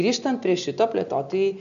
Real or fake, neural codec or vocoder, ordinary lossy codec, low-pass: real; none; MP3, 96 kbps; 7.2 kHz